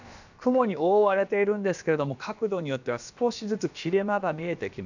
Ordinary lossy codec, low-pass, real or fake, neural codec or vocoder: none; 7.2 kHz; fake; codec, 16 kHz, about 1 kbps, DyCAST, with the encoder's durations